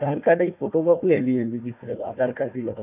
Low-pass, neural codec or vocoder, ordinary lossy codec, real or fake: 3.6 kHz; codec, 16 kHz, 1 kbps, FunCodec, trained on Chinese and English, 50 frames a second; none; fake